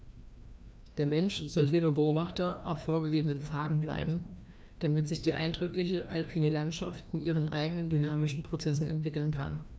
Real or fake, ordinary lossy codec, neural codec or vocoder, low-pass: fake; none; codec, 16 kHz, 1 kbps, FreqCodec, larger model; none